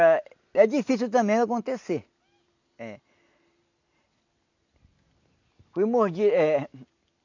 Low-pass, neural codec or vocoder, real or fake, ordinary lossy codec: 7.2 kHz; none; real; none